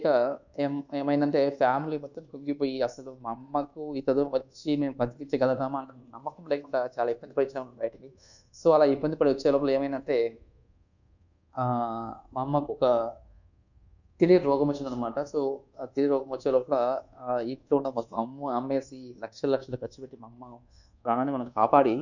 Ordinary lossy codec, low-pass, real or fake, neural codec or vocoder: none; 7.2 kHz; fake; codec, 24 kHz, 1.2 kbps, DualCodec